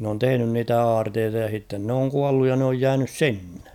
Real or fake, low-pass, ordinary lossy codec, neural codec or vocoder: real; 19.8 kHz; none; none